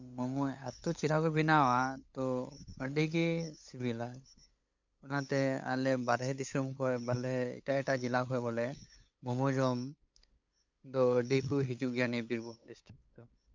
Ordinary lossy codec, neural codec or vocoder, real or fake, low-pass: MP3, 64 kbps; codec, 16 kHz, 8 kbps, FunCodec, trained on LibriTTS, 25 frames a second; fake; 7.2 kHz